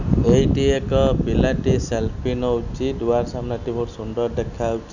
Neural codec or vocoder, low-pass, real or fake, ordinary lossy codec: none; 7.2 kHz; real; none